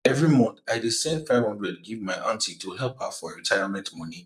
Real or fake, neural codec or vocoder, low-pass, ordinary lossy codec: fake; vocoder, 44.1 kHz, 128 mel bands, Pupu-Vocoder; 14.4 kHz; none